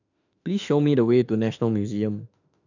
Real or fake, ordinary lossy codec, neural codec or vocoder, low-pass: fake; none; autoencoder, 48 kHz, 32 numbers a frame, DAC-VAE, trained on Japanese speech; 7.2 kHz